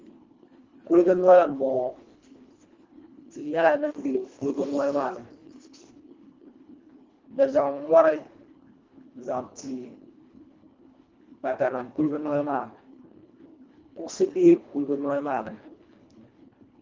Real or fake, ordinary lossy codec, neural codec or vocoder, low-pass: fake; Opus, 32 kbps; codec, 24 kHz, 1.5 kbps, HILCodec; 7.2 kHz